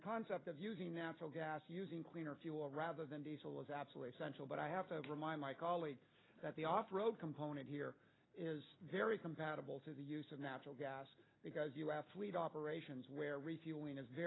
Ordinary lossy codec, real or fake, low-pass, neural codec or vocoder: AAC, 16 kbps; real; 7.2 kHz; none